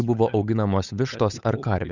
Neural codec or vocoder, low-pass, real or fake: codec, 16 kHz, 8 kbps, FunCodec, trained on Chinese and English, 25 frames a second; 7.2 kHz; fake